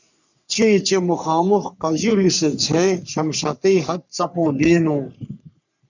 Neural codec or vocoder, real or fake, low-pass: codec, 44.1 kHz, 3.4 kbps, Pupu-Codec; fake; 7.2 kHz